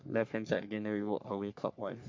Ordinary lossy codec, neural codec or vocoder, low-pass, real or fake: MP3, 48 kbps; codec, 44.1 kHz, 3.4 kbps, Pupu-Codec; 7.2 kHz; fake